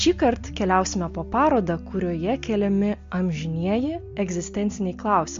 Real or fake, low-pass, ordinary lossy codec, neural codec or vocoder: real; 7.2 kHz; MP3, 48 kbps; none